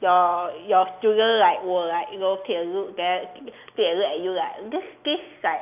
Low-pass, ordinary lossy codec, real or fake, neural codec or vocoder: 3.6 kHz; none; real; none